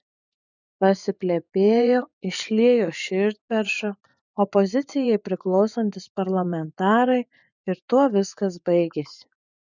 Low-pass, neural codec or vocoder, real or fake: 7.2 kHz; vocoder, 24 kHz, 100 mel bands, Vocos; fake